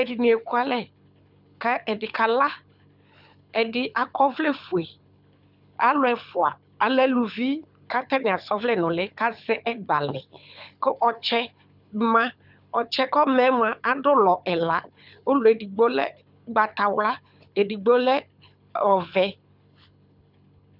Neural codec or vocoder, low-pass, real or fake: codec, 24 kHz, 6 kbps, HILCodec; 5.4 kHz; fake